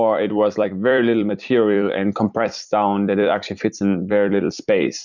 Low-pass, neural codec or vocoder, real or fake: 7.2 kHz; vocoder, 44.1 kHz, 128 mel bands every 256 samples, BigVGAN v2; fake